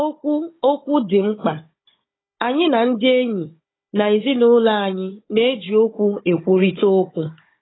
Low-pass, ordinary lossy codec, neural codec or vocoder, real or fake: 7.2 kHz; AAC, 16 kbps; codec, 16 kHz, 16 kbps, FunCodec, trained on Chinese and English, 50 frames a second; fake